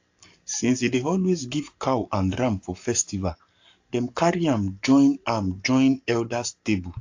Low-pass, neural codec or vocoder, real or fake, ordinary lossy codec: 7.2 kHz; codec, 44.1 kHz, 7.8 kbps, Pupu-Codec; fake; AAC, 48 kbps